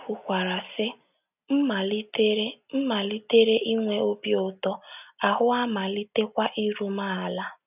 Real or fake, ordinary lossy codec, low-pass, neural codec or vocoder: real; none; 3.6 kHz; none